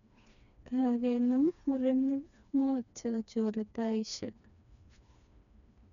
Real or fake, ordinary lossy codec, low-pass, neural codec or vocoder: fake; none; 7.2 kHz; codec, 16 kHz, 2 kbps, FreqCodec, smaller model